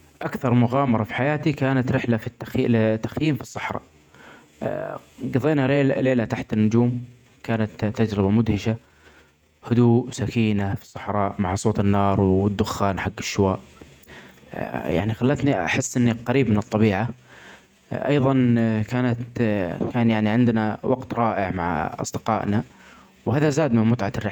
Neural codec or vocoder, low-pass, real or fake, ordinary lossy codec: vocoder, 48 kHz, 128 mel bands, Vocos; 19.8 kHz; fake; none